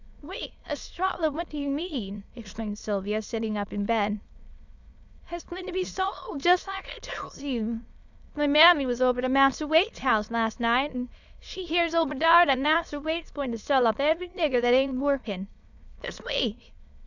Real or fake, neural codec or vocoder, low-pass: fake; autoencoder, 22.05 kHz, a latent of 192 numbers a frame, VITS, trained on many speakers; 7.2 kHz